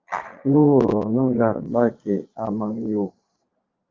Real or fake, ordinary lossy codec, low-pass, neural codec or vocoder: fake; Opus, 24 kbps; 7.2 kHz; vocoder, 22.05 kHz, 80 mel bands, Vocos